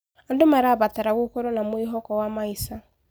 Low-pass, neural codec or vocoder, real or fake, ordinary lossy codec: none; none; real; none